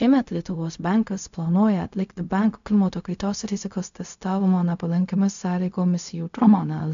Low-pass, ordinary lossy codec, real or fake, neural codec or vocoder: 7.2 kHz; MP3, 64 kbps; fake; codec, 16 kHz, 0.4 kbps, LongCat-Audio-Codec